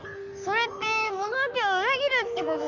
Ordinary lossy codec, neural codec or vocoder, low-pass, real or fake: none; codec, 44.1 kHz, 3.4 kbps, Pupu-Codec; 7.2 kHz; fake